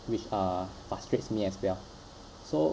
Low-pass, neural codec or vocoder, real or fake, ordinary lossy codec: none; none; real; none